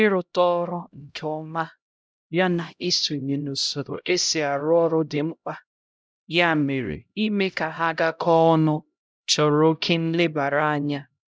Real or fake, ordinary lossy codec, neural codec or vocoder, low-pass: fake; none; codec, 16 kHz, 0.5 kbps, X-Codec, HuBERT features, trained on LibriSpeech; none